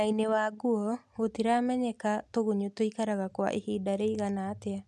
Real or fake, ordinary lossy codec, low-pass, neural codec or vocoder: real; none; none; none